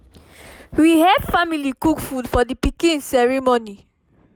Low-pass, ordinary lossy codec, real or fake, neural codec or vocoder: none; none; real; none